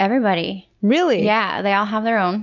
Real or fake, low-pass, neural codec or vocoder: real; 7.2 kHz; none